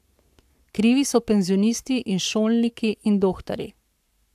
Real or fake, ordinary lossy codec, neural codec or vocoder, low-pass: fake; AAC, 96 kbps; codec, 44.1 kHz, 7.8 kbps, Pupu-Codec; 14.4 kHz